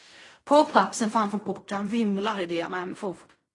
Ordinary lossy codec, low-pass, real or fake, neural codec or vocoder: AAC, 32 kbps; 10.8 kHz; fake; codec, 16 kHz in and 24 kHz out, 0.4 kbps, LongCat-Audio-Codec, fine tuned four codebook decoder